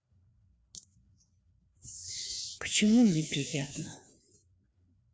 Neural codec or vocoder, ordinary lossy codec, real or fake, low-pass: codec, 16 kHz, 2 kbps, FreqCodec, larger model; none; fake; none